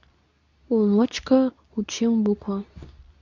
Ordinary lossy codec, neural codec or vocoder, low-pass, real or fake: none; codec, 24 kHz, 0.9 kbps, WavTokenizer, medium speech release version 2; 7.2 kHz; fake